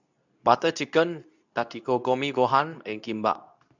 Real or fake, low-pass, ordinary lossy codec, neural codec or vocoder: fake; 7.2 kHz; none; codec, 24 kHz, 0.9 kbps, WavTokenizer, medium speech release version 2